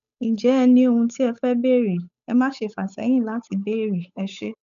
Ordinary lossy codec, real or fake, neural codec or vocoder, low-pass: none; fake; codec, 16 kHz, 8 kbps, FunCodec, trained on Chinese and English, 25 frames a second; 7.2 kHz